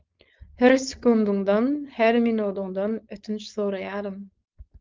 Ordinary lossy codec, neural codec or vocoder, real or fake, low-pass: Opus, 32 kbps; codec, 16 kHz, 4.8 kbps, FACodec; fake; 7.2 kHz